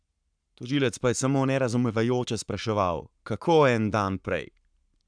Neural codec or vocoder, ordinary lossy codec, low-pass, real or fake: codec, 44.1 kHz, 7.8 kbps, Pupu-Codec; none; 9.9 kHz; fake